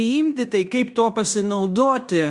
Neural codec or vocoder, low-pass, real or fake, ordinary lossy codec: codec, 16 kHz in and 24 kHz out, 0.9 kbps, LongCat-Audio-Codec, fine tuned four codebook decoder; 10.8 kHz; fake; Opus, 64 kbps